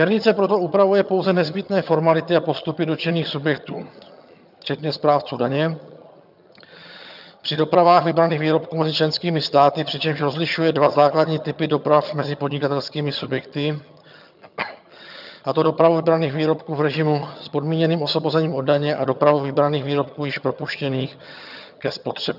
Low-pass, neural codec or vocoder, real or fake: 5.4 kHz; vocoder, 22.05 kHz, 80 mel bands, HiFi-GAN; fake